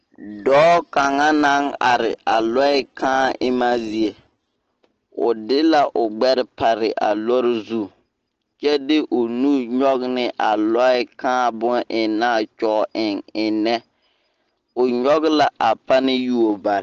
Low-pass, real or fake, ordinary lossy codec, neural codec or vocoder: 7.2 kHz; real; Opus, 16 kbps; none